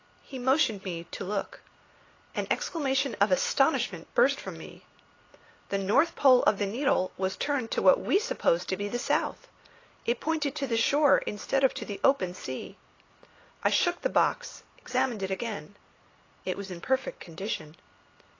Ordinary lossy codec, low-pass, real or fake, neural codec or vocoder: AAC, 32 kbps; 7.2 kHz; real; none